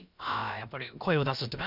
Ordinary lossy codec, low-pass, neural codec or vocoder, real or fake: none; 5.4 kHz; codec, 16 kHz, about 1 kbps, DyCAST, with the encoder's durations; fake